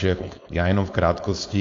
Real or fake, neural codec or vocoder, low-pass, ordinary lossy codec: fake; codec, 16 kHz, 4.8 kbps, FACodec; 7.2 kHz; AAC, 48 kbps